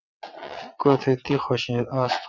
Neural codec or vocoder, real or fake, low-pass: vocoder, 22.05 kHz, 80 mel bands, WaveNeXt; fake; 7.2 kHz